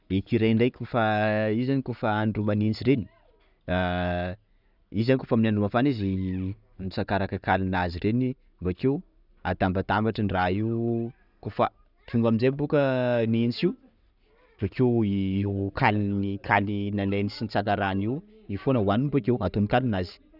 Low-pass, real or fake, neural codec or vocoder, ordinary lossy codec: 5.4 kHz; real; none; none